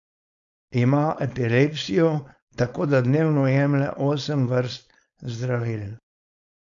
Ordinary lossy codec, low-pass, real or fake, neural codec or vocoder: AAC, 64 kbps; 7.2 kHz; fake; codec, 16 kHz, 4.8 kbps, FACodec